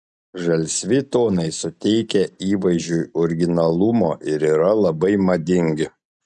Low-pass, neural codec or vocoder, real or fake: 10.8 kHz; none; real